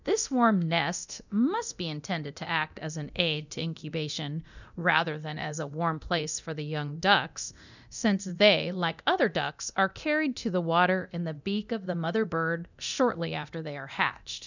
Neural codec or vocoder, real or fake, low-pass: codec, 24 kHz, 0.9 kbps, DualCodec; fake; 7.2 kHz